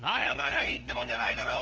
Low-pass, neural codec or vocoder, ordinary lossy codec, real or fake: 7.2 kHz; codec, 16 kHz, 2 kbps, FunCodec, trained on LibriTTS, 25 frames a second; Opus, 16 kbps; fake